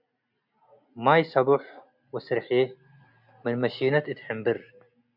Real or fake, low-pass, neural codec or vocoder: real; 5.4 kHz; none